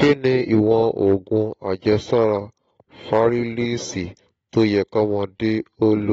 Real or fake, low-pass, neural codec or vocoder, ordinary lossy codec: real; 19.8 kHz; none; AAC, 24 kbps